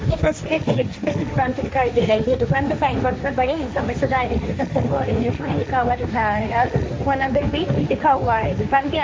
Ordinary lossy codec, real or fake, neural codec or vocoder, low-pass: none; fake; codec, 16 kHz, 1.1 kbps, Voila-Tokenizer; none